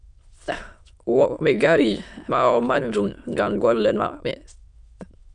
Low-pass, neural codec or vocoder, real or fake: 9.9 kHz; autoencoder, 22.05 kHz, a latent of 192 numbers a frame, VITS, trained on many speakers; fake